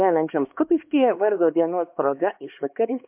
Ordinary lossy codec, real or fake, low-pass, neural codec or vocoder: MP3, 32 kbps; fake; 3.6 kHz; codec, 16 kHz, 2 kbps, X-Codec, HuBERT features, trained on LibriSpeech